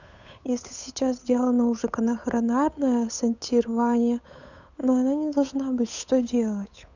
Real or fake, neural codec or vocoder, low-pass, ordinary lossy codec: fake; codec, 16 kHz, 8 kbps, FunCodec, trained on Chinese and English, 25 frames a second; 7.2 kHz; none